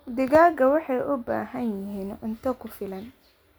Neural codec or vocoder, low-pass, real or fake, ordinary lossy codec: none; none; real; none